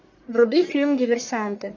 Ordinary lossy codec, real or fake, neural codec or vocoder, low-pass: MP3, 64 kbps; fake; codec, 44.1 kHz, 1.7 kbps, Pupu-Codec; 7.2 kHz